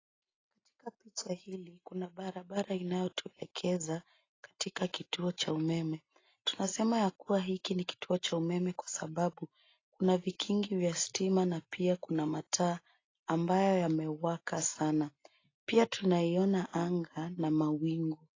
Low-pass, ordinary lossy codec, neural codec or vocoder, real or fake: 7.2 kHz; AAC, 32 kbps; none; real